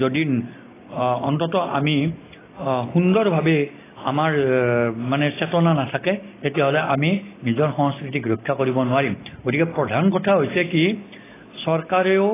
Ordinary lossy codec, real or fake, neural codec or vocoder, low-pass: AAC, 16 kbps; real; none; 3.6 kHz